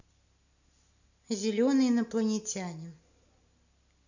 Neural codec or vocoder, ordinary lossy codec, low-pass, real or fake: none; none; 7.2 kHz; real